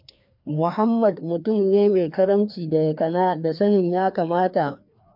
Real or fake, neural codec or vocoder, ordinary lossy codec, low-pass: fake; codec, 16 kHz, 2 kbps, FreqCodec, larger model; MP3, 48 kbps; 5.4 kHz